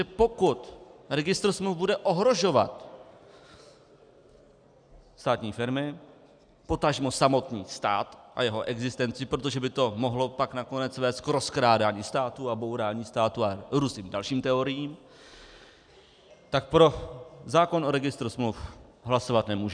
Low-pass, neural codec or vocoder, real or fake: 9.9 kHz; none; real